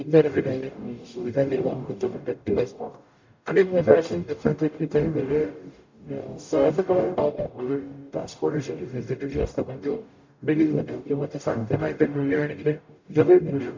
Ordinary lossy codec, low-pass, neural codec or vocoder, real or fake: MP3, 64 kbps; 7.2 kHz; codec, 44.1 kHz, 0.9 kbps, DAC; fake